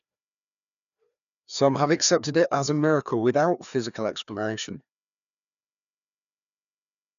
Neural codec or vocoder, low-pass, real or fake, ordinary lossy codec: codec, 16 kHz, 2 kbps, FreqCodec, larger model; 7.2 kHz; fake; none